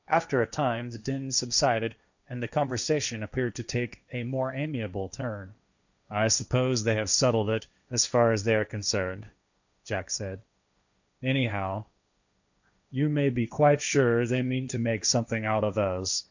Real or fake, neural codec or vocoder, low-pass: fake; codec, 16 kHz, 1.1 kbps, Voila-Tokenizer; 7.2 kHz